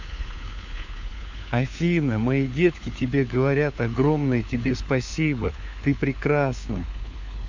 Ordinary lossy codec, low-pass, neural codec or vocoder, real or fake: MP3, 64 kbps; 7.2 kHz; codec, 16 kHz, 4 kbps, FunCodec, trained on LibriTTS, 50 frames a second; fake